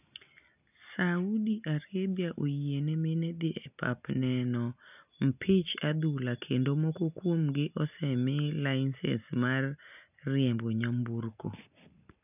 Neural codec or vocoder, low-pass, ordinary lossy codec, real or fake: none; 3.6 kHz; none; real